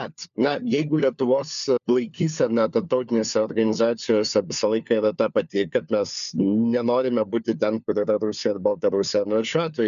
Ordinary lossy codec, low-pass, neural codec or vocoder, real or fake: MP3, 96 kbps; 7.2 kHz; codec, 16 kHz, 4 kbps, FunCodec, trained on LibriTTS, 50 frames a second; fake